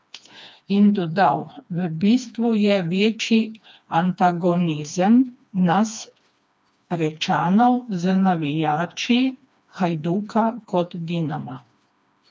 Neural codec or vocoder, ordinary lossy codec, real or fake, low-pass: codec, 16 kHz, 2 kbps, FreqCodec, smaller model; none; fake; none